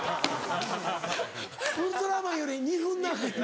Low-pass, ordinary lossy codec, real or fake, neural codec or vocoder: none; none; real; none